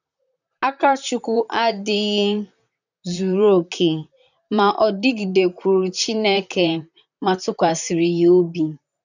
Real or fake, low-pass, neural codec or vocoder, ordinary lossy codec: fake; 7.2 kHz; vocoder, 44.1 kHz, 128 mel bands, Pupu-Vocoder; none